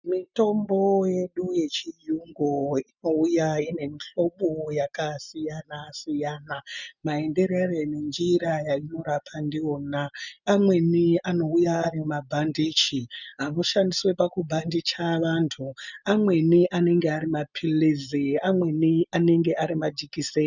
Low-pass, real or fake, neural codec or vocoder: 7.2 kHz; real; none